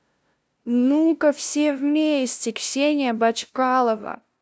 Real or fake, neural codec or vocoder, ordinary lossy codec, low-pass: fake; codec, 16 kHz, 0.5 kbps, FunCodec, trained on LibriTTS, 25 frames a second; none; none